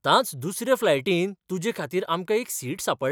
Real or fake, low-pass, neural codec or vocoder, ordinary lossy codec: real; none; none; none